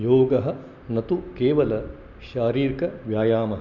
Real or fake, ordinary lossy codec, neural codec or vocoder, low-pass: real; Opus, 64 kbps; none; 7.2 kHz